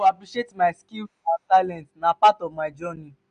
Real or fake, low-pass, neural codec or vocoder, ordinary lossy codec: real; 9.9 kHz; none; none